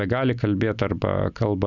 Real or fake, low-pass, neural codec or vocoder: real; 7.2 kHz; none